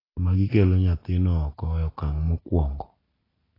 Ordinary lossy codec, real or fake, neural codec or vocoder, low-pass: AAC, 24 kbps; real; none; 5.4 kHz